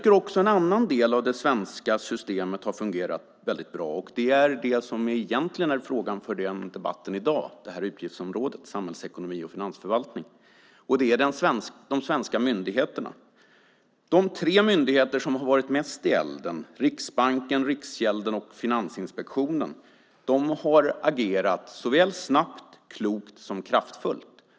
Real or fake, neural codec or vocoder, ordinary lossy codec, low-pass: real; none; none; none